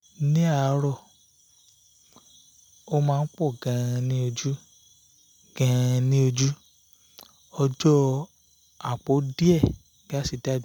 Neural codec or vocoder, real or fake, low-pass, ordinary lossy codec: none; real; none; none